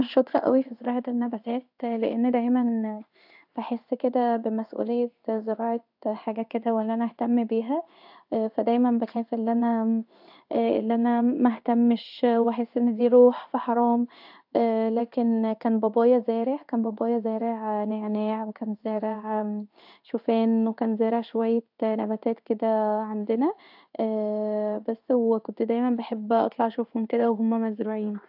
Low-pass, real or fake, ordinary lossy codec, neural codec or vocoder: 5.4 kHz; fake; AAC, 48 kbps; codec, 16 kHz in and 24 kHz out, 1 kbps, XY-Tokenizer